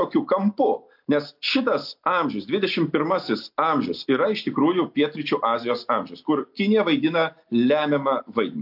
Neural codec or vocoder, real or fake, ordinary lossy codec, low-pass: none; real; AAC, 48 kbps; 5.4 kHz